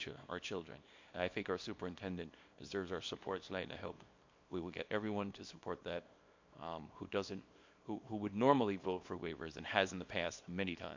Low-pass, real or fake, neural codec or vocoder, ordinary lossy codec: 7.2 kHz; fake; codec, 24 kHz, 0.9 kbps, WavTokenizer, small release; MP3, 48 kbps